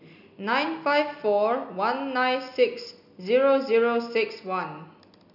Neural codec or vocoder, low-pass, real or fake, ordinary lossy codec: none; 5.4 kHz; real; none